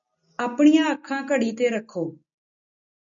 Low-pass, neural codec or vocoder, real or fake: 7.2 kHz; none; real